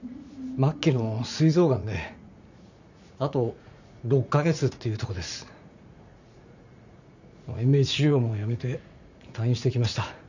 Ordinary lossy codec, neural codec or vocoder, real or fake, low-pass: none; vocoder, 44.1 kHz, 80 mel bands, Vocos; fake; 7.2 kHz